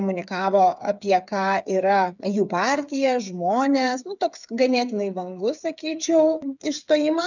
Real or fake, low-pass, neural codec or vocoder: fake; 7.2 kHz; codec, 16 kHz, 8 kbps, FreqCodec, smaller model